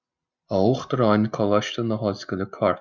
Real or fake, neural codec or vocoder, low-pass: real; none; 7.2 kHz